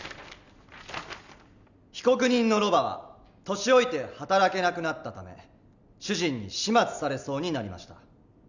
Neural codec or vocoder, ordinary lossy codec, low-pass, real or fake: none; none; 7.2 kHz; real